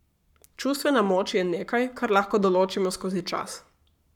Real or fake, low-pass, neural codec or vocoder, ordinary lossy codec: fake; 19.8 kHz; codec, 44.1 kHz, 7.8 kbps, Pupu-Codec; none